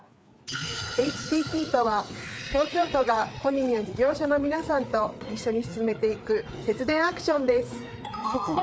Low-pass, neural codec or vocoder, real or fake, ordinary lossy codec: none; codec, 16 kHz, 4 kbps, FreqCodec, larger model; fake; none